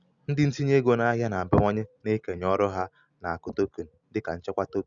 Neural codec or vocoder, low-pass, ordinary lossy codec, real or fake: none; 7.2 kHz; Opus, 64 kbps; real